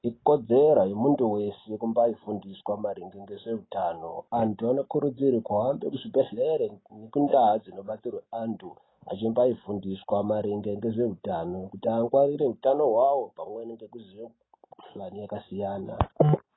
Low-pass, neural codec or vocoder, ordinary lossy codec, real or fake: 7.2 kHz; none; AAC, 16 kbps; real